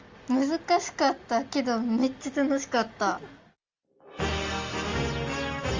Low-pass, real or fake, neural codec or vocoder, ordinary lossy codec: 7.2 kHz; real; none; Opus, 32 kbps